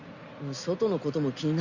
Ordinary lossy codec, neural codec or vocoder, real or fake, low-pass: Opus, 64 kbps; none; real; 7.2 kHz